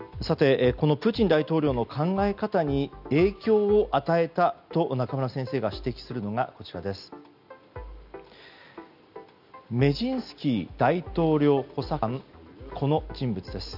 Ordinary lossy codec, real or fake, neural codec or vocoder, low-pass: none; real; none; 5.4 kHz